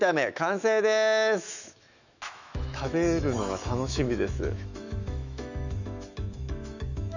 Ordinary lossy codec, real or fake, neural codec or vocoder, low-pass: none; fake; autoencoder, 48 kHz, 128 numbers a frame, DAC-VAE, trained on Japanese speech; 7.2 kHz